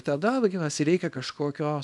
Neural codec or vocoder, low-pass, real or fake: codec, 24 kHz, 0.9 kbps, WavTokenizer, small release; 10.8 kHz; fake